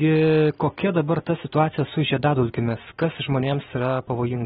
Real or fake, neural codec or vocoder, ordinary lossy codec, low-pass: real; none; AAC, 16 kbps; 7.2 kHz